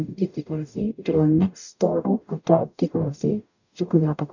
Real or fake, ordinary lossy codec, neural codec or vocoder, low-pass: fake; none; codec, 44.1 kHz, 0.9 kbps, DAC; 7.2 kHz